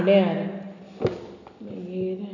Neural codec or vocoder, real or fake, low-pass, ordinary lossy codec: none; real; 7.2 kHz; none